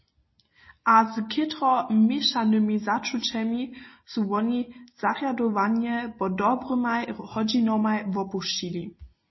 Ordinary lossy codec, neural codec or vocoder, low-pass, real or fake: MP3, 24 kbps; none; 7.2 kHz; real